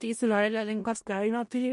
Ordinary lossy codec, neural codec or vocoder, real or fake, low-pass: MP3, 48 kbps; codec, 16 kHz in and 24 kHz out, 0.4 kbps, LongCat-Audio-Codec, four codebook decoder; fake; 10.8 kHz